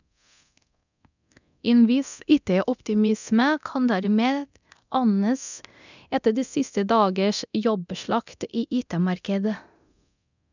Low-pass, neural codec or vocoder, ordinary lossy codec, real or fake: 7.2 kHz; codec, 24 kHz, 0.9 kbps, DualCodec; none; fake